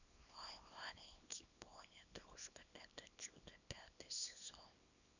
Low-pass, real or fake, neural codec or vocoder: 7.2 kHz; fake; codec, 24 kHz, 0.9 kbps, WavTokenizer, small release